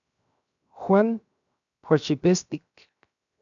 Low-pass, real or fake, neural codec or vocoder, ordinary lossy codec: 7.2 kHz; fake; codec, 16 kHz, 0.7 kbps, FocalCodec; MP3, 96 kbps